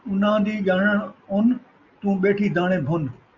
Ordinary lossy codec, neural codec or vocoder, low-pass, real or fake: Opus, 64 kbps; none; 7.2 kHz; real